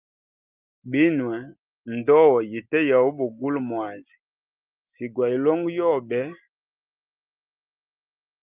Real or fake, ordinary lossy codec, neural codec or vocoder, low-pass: real; Opus, 32 kbps; none; 3.6 kHz